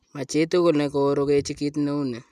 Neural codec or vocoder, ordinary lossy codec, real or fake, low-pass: none; none; real; 14.4 kHz